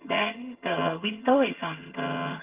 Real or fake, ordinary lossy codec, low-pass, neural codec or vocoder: fake; Opus, 32 kbps; 3.6 kHz; vocoder, 22.05 kHz, 80 mel bands, HiFi-GAN